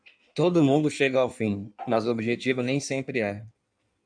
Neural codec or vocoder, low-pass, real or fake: codec, 16 kHz in and 24 kHz out, 1.1 kbps, FireRedTTS-2 codec; 9.9 kHz; fake